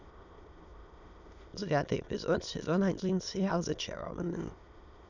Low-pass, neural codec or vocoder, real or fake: 7.2 kHz; autoencoder, 22.05 kHz, a latent of 192 numbers a frame, VITS, trained on many speakers; fake